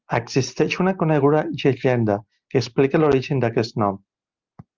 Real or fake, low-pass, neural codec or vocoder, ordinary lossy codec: real; 7.2 kHz; none; Opus, 32 kbps